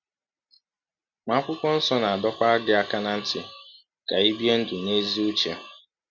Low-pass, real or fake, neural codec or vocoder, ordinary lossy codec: 7.2 kHz; real; none; none